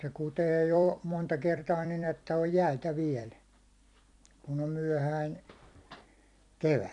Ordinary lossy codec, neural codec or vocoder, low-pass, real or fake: none; vocoder, 44.1 kHz, 128 mel bands every 256 samples, BigVGAN v2; 10.8 kHz; fake